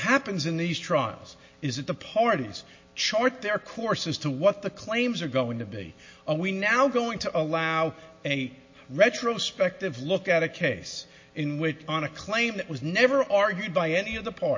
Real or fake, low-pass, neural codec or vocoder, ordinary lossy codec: real; 7.2 kHz; none; MP3, 32 kbps